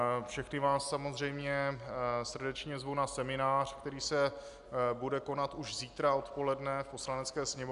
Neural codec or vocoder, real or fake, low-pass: none; real; 10.8 kHz